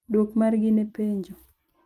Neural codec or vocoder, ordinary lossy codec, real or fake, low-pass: vocoder, 44.1 kHz, 128 mel bands every 256 samples, BigVGAN v2; Opus, 32 kbps; fake; 14.4 kHz